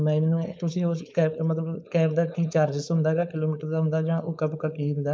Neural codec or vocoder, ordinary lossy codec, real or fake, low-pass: codec, 16 kHz, 4.8 kbps, FACodec; none; fake; none